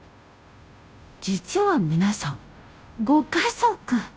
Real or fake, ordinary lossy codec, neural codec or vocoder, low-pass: fake; none; codec, 16 kHz, 0.5 kbps, FunCodec, trained on Chinese and English, 25 frames a second; none